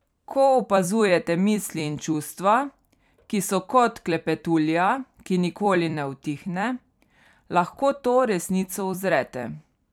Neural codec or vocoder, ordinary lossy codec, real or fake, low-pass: vocoder, 44.1 kHz, 128 mel bands every 256 samples, BigVGAN v2; none; fake; 19.8 kHz